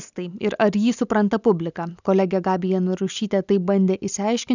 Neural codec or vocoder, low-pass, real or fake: none; 7.2 kHz; real